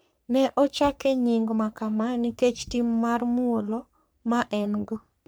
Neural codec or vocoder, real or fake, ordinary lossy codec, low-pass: codec, 44.1 kHz, 3.4 kbps, Pupu-Codec; fake; none; none